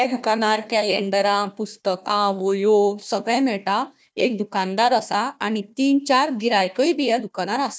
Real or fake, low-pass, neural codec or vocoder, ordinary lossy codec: fake; none; codec, 16 kHz, 1 kbps, FunCodec, trained on Chinese and English, 50 frames a second; none